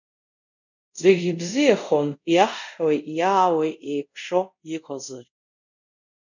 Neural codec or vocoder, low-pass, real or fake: codec, 24 kHz, 0.5 kbps, DualCodec; 7.2 kHz; fake